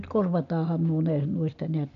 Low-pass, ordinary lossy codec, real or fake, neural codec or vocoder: 7.2 kHz; none; real; none